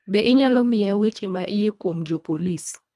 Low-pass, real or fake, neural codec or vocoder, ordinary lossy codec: none; fake; codec, 24 kHz, 1.5 kbps, HILCodec; none